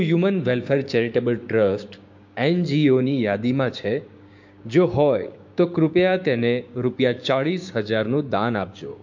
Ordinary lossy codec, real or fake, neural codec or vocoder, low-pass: MP3, 48 kbps; fake; codec, 16 kHz, 6 kbps, DAC; 7.2 kHz